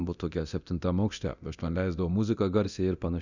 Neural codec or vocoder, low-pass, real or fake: codec, 24 kHz, 0.9 kbps, DualCodec; 7.2 kHz; fake